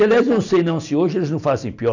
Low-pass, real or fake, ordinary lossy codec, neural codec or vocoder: 7.2 kHz; real; none; none